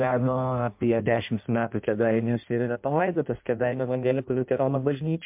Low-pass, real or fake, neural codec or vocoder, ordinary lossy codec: 3.6 kHz; fake; codec, 16 kHz in and 24 kHz out, 0.6 kbps, FireRedTTS-2 codec; MP3, 32 kbps